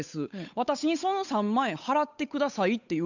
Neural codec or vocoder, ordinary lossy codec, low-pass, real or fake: codec, 16 kHz, 8 kbps, FunCodec, trained on Chinese and English, 25 frames a second; none; 7.2 kHz; fake